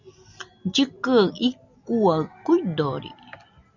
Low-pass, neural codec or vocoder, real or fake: 7.2 kHz; none; real